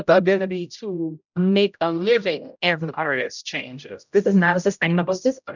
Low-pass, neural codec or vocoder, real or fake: 7.2 kHz; codec, 16 kHz, 0.5 kbps, X-Codec, HuBERT features, trained on general audio; fake